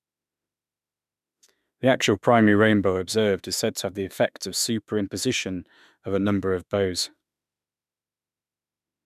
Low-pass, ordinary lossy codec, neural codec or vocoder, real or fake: 14.4 kHz; none; autoencoder, 48 kHz, 32 numbers a frame, DAC-VAE, trained on Japanese speech; fake